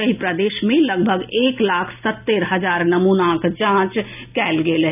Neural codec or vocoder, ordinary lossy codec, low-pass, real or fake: none; none; 3.6 kHz; real